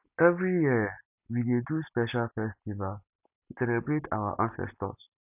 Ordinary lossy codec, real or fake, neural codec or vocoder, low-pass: none; real; none; 3.6 kHz